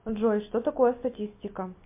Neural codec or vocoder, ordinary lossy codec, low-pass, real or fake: none; MP3, 24 kbps; 3.6 kHz; real